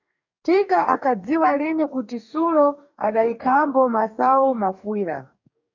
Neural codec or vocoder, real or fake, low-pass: codec, 44.1 kHz, 2.6 kbps, DAC; fake; 7.2 kHz